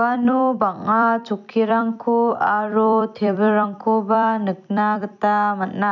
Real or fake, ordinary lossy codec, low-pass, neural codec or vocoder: fake; none; 7.2 kHz; vocoder, 44.1 kHz, 128 mel bands every 256 samples, BigVGAN v2